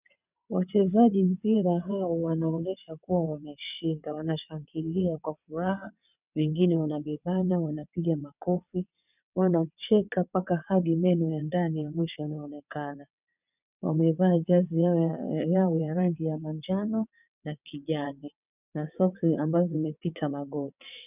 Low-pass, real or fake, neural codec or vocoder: 3.6 kHz; fake; vocoder, 22.05 kHz, 80 mel bands, WaveNeXt